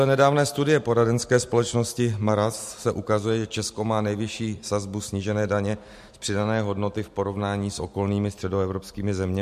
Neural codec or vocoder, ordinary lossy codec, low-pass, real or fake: none; MP3, 64 kbps; 14.4 kHz; real